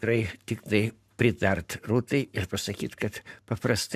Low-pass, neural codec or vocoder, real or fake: 14.4 kHz; codec, 44.1 kHz, 7.8 kbps, Pupu-Codec; fake